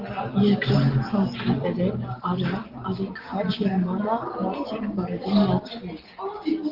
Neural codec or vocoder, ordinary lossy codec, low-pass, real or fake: none; Opus, 16 kbps; 5.4 kHz; real